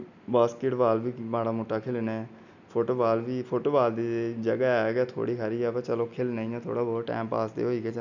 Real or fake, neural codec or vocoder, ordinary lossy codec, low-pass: real; none; none; 7.2 kHz